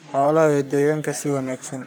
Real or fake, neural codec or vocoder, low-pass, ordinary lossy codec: fake; codec, 44.1 kHz, 3.4 kbps, Pupu-Codec; none; none